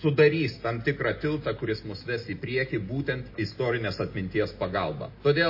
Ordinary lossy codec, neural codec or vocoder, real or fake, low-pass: MP3, 24 kbps; none; real; 5.4 kHz